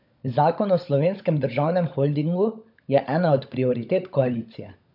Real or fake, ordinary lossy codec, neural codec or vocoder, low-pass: fake; none; codec, 16 kHz, 16 kbps, FunCodec, trained on LibriTTS, 50 frames a second; 5.4 kHz